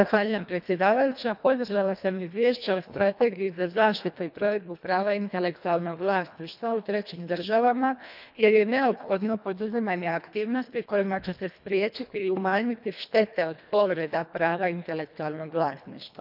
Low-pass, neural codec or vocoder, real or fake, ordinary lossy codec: 5.4 kHz; codec, 24 kHz, 1.5 kbps, HILCodec; fake; none